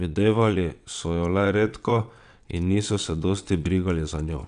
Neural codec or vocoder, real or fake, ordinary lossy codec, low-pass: vocoder, 22.05 kHz, 80 mel bands, WaveNeXt; fake; none; 9.9 kHz